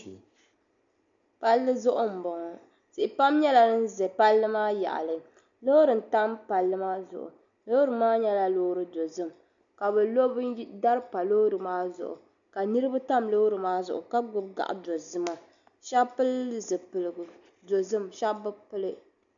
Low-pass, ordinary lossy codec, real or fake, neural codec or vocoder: 7.2 kHz; MP3, 96 kbps; real; none